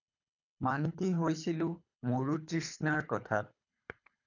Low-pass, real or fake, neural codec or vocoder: 7.2 kHz; fake; codec, 24 kHz, 3 kbps, HILCodec